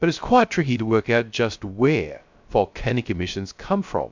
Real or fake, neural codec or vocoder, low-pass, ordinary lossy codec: fake; codec, 16 kHz, 0.3 kbps, FocalCodec; 7.2 kHz; MP3, 64 kbps